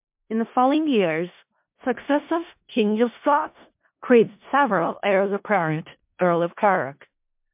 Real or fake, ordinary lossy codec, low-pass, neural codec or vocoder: fake; MP3, 32 kbps; 3.6 kHz; codec, 16 kHz in and 24 kHz out, 0.4 kbps, LongCat-Audio-Codec, four codebook decoder